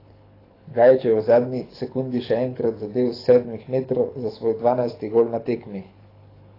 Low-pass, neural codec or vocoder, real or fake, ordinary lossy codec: 5.4 kHz; codec, 24 kHz, 6 kbps, HILCodec; fake; AAC, 24 kbps